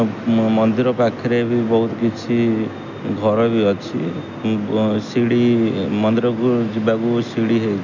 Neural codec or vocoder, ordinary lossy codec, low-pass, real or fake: none; none; 7.2 kHz; real